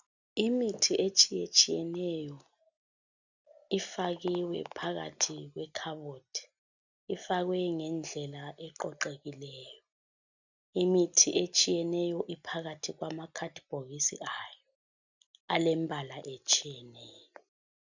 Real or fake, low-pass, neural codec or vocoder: real; 7.2 kHz; none